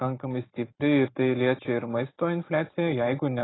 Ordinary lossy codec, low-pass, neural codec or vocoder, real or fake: AAC, 16 kbps; 7.2 kHz; none; real